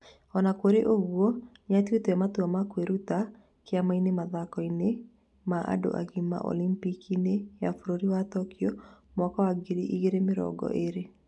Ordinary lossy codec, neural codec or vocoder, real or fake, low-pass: none; none; real; 10.8 kHz